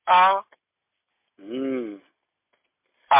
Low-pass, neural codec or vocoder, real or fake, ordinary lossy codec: 3.6 kHz; none; real; MP3, 32 kbps